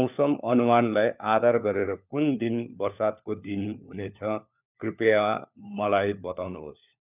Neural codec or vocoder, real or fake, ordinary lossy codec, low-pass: codec, 16 kHz, 4 kbps, FunCodec, trained on LibriTTS, 50 frames a second; fake; none; 3.6 kHz